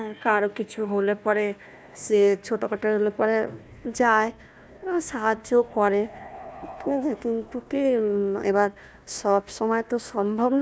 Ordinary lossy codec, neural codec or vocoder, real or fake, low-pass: none; codec, 16 kHz, 1 kbps, FunCodec, trained on Chinese and English, 50 frames a second; fake; none